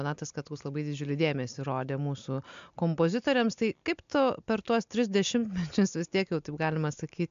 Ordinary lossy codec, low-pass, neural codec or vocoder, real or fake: MP3, 64 kbps; 7.2 kHz; none; real